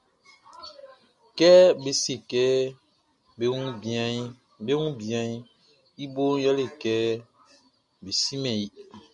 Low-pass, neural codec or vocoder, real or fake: 10.8 kHz; none; real